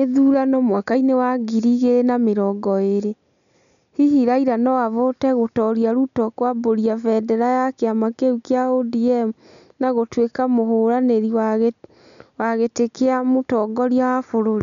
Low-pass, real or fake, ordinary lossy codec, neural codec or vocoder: 7.2 kHz; real; none; none